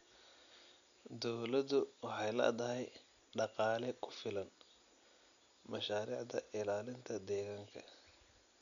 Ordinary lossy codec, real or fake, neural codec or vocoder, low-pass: none; real; none; 7.2 kHz